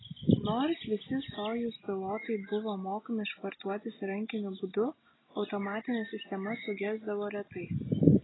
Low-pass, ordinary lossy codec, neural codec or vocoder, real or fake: 7.2 kHz; AAC, 16 kbps; none; real